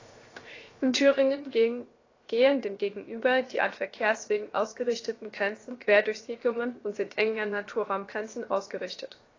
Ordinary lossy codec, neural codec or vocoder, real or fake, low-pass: AAC, 32 kbps; codec, 16 kHz, 0.7 kbps, FocalCodec; fake; 7.2 kHz